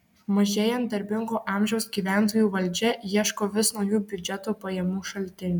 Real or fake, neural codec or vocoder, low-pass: real; none; 19.8 kHz